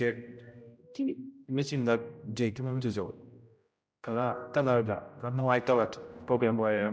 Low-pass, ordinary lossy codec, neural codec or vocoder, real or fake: none; none; codec, 16 kHz, 0.5 kbps, X-Codec, HuBERT features, trained on general audio; fake